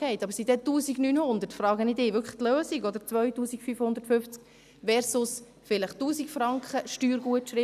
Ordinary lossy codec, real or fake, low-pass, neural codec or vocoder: none; real; 14.4 kHz; none